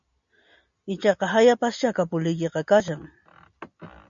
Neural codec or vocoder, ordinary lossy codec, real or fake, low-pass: none; MP3, 96 kbps; real; 7.2 kHz